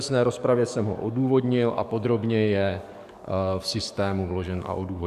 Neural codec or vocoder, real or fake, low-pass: codec, 44.1 kHz, 7.8 kbps, DAC; fake; 14.4 kHz